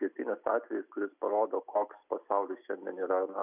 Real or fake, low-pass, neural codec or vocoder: real; 3.6 kHz; none